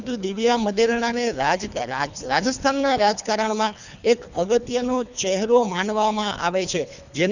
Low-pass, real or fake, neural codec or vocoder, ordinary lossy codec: 7.2 kHz; fake; codec, 24 kHz, 3 kbps, HILCodec; none